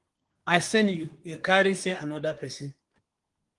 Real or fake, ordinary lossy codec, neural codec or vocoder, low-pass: fake; Opus, 24 kbps; codec, 24 kHz, 1 kbps, SNAC; 10.8 kHz